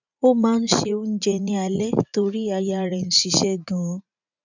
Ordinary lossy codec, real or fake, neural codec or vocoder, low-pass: none; fake; vocoder, 44.1 kHz, 80 mel bands, Vocos; 7.2 kHz